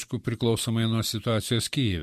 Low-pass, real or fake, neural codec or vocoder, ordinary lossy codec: 14.4 kHz; fake; vocoder, 44.1 kHz, 128 mel bands every 256 samples, BigVGAN v2; MP3, 64 kbps